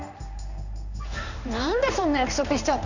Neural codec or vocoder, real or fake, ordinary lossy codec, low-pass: codec, 16 kHz in and 24 kHz out, 1 kbps, XY-Tokenizer; fake; none; 7.2 kHz